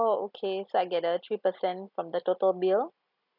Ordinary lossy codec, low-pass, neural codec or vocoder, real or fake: none; 5.4 kHz; none; real